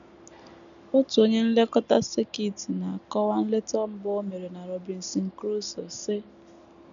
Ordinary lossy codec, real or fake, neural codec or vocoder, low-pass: none; real; none; 7.2 kHz